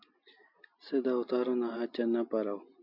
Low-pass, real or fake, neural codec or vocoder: 5.4 kHz; real; none